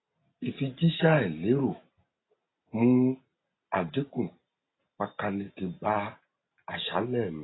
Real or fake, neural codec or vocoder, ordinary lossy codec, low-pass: real; none; AAC, 16 kbps; 7.2 kHz